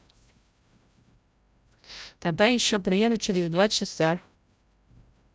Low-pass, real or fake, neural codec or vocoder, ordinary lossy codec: none; fake; codec, 16 kHz, 0.5 kbps, FreqCodec, larger model; none